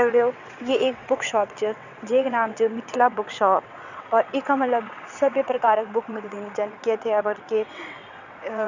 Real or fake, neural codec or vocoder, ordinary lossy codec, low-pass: fake; vocoder, 22.05 kHz, 80 mel bands, WaveNeXt; none; 7.2 kHz